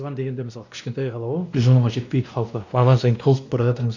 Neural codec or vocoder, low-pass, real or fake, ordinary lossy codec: codec, 16 kHz, 1 kbps, X-Codec, WavLM features, trained on Multilingual LibriSpeech; 7.2 kHz; fake; none